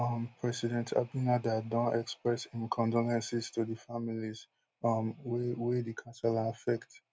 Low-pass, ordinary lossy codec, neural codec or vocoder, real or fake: none; none; none; real